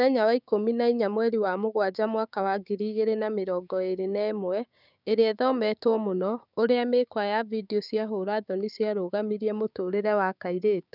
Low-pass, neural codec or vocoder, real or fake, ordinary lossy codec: 5.4 kHz; codec, 16 kHz, 6 kbps, DAC; fake; none